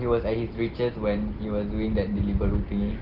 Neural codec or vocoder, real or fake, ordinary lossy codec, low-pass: none; real; Opus, 16 kbps; 5.4 kHz